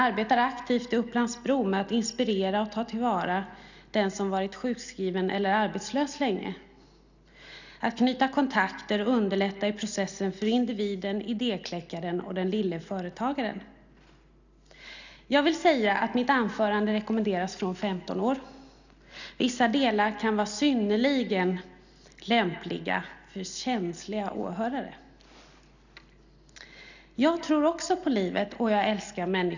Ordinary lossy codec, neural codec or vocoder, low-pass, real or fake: none; none; 7.2 kHz; real